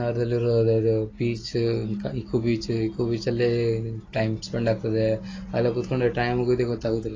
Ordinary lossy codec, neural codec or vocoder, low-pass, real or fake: AAC, 32 kbps; none; 7.2 kHz; real